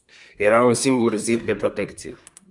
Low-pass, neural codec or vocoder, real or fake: 10.8 kHz; codec, 24 kHz, 1 kbps, SNAC; fake